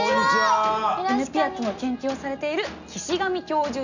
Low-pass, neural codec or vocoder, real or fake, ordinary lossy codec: 7.2 kHz; none; real; none